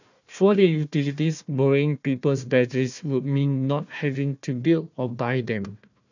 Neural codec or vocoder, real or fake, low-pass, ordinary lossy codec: codec, 16 kHz, 1 kbps, FunCodec, trained on Chinese and English, 50 frames a second; fake; 7.2 kHz; none